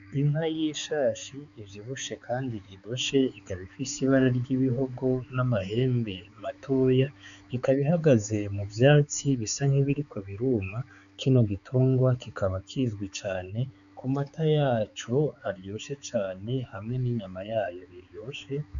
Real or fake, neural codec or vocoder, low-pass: fake; codec, 16 kHz, 4 kbps, X-Codec, HuBERT features, trained on balanced general audio; 7.2 kHz